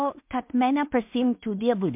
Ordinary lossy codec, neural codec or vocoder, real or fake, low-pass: MP3, 32 kbps; codec, 16 kHz in and 24 kHz out, 0.4 kbps, LongCat-Audio-Codec, two codebook decoder; fake; 3.6 kHz